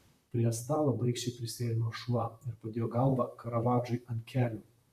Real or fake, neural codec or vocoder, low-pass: fake; vocoder, 44.1 kHz, 128 mel bands, Pupu-Vocoder; 14.4 kHz